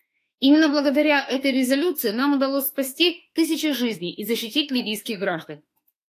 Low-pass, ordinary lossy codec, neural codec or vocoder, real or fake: 14.4 kHz; AAC, 64 kbps; autoencoder, 48 kHz, 32 numbers a frame, DAC-VAE, trained on Japanese speech; fake